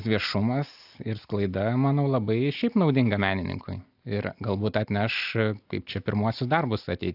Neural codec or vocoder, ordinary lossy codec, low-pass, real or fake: none; AAC, 48 kbps; 5.4 kHz; real